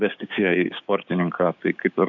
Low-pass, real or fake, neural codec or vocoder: 7.2 kHz; fake; codec, 16 kHz, 6 kbps, DAC